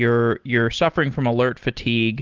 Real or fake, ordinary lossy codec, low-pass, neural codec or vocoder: real; Opus, 16 kbps; 7.2 kHz; none